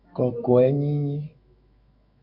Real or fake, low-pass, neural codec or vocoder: fake; 5.4 kHz; autoencoder, 48 kHz, 128 numbers a frame, DAC-VAE, trained on Japanese speech